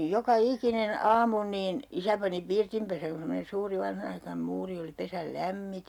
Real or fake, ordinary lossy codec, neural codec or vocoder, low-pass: real; none; none; 19.8 kHz